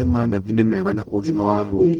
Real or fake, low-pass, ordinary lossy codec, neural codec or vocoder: fake; 19.8 kHz; Opus, 32 kbps; codec, 44.1 kHz, 0.9 kbps, DAC